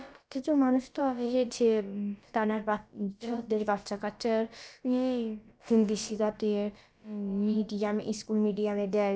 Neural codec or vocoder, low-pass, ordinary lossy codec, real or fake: codec, 16 kHz, about 1 kbps, DyCAST, with the encoder's durations; none; none; fake